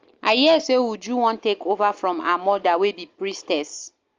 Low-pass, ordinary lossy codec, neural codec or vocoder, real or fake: 7.2 kHz; Opus, 32 kbps; none; real